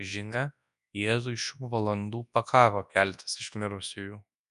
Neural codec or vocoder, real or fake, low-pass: codec, 24 kHz, 0.9 kbps, WavTokenizer, large speech release; fake; 10.8 kHz